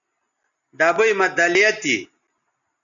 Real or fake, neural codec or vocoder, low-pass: real; none; 7.2 kHz